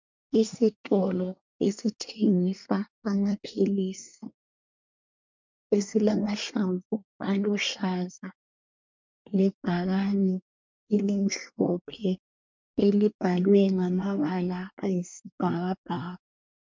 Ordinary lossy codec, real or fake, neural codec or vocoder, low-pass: MP3, 64 kbps; fake; codec, 24 kHz, 1 kbps, SNAC; 7.2 kHz